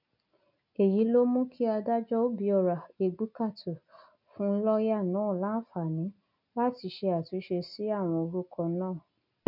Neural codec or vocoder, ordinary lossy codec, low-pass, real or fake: none; none; 5.4 kHz; real